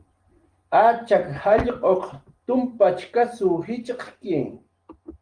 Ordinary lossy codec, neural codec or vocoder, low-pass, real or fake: Opus, 24 kbps; none; 9.9 kHz; real